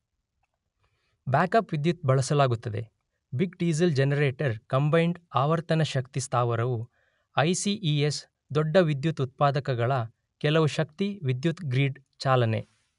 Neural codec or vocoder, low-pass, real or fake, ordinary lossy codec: none; 10.8 kHz; real; none